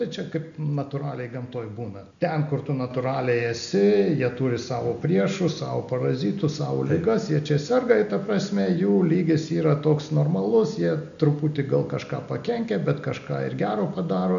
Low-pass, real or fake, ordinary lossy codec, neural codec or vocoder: 7.2 kHz; real; MP3, 96 kbps; none